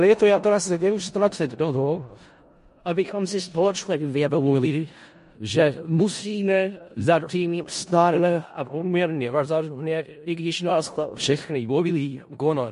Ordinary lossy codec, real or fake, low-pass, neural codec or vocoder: MP3, 48 kbps; fake; 10.8 kHz; codec, 16 kHz in and 24 kHz out, 0.4 kbps, LongCat-Audio-Codec, four codebook decoder